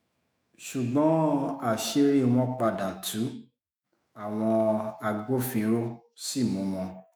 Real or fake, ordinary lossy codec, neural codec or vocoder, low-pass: fake; none; autoencoder, 48 kHz, 128 numbers a frame, DAC-VAE, trained on Japanese speech; none